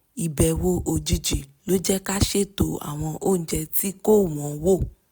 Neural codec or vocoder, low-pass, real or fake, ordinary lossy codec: none; none; real; none